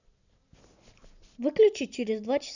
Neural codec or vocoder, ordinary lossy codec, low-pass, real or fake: none; none; 7.2 kHz; real